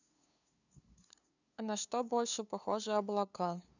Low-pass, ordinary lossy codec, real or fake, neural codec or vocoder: 7.2 kHz; none; fake; codec, 16 kHz, 2 kbps, FunCodec, trained on LibriTTS, 25 frames a second